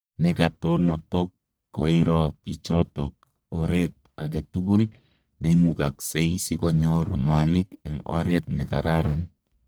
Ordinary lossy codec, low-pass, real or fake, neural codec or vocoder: none; none; fake; codec, 44.1 kHz, 1.7 kbps, Pupu-Codec